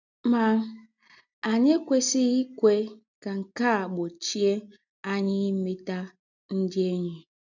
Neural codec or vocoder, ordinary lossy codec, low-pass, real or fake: none; none; 7.2 kHz; real